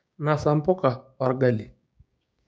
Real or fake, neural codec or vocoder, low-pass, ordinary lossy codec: fake; codec, 16 kHz, 6 kbps, DAC; none; none